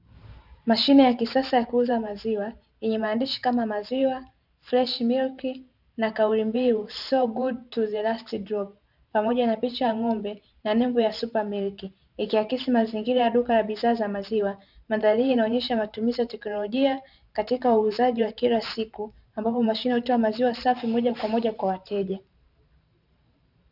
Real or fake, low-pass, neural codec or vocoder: fake; 5.4 kHz; vocoder, 44.1 kHz, 128 mel bands every 512 samples, BigVGAN v2